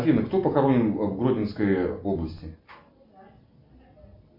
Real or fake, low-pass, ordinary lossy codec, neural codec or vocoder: real; 5.4 kHz; MP3, 32 kbps; none